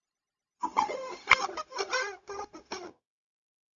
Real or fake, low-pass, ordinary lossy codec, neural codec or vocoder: fake; 7.2 kHz; Opus, 64 kbps; codec, 16 kHz, 0.4 kbps, LongCat-Audio-Codec